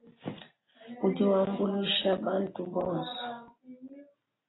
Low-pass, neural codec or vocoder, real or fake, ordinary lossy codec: 7.2 kHz; none; real; AAC, 16 kbps